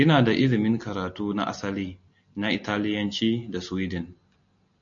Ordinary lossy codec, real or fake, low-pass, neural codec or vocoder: MP3, 48 kbps; real; 7.2 kHz; none